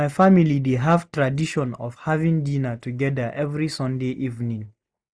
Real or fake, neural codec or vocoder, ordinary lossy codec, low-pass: real; none; none; none